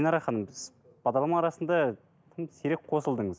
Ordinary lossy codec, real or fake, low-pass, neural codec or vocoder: none; real; none; none